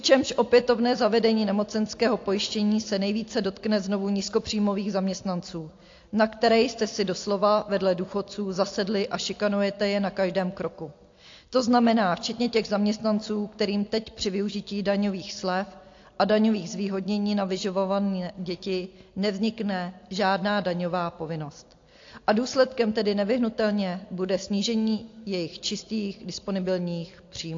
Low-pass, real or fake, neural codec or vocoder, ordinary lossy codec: 7.2 kHz; real; none; AAC, 48 kbps